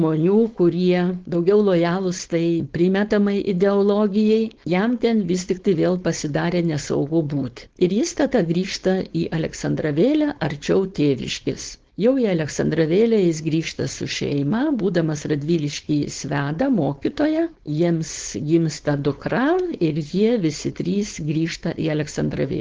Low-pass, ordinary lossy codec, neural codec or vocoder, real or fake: 7.2 kHz; Opus, 16 kbps; codec, 16 kHz, 4.8 kbps, FACodec; fake